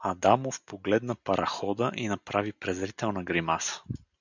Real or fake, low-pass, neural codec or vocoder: real; 7.2 kHz; none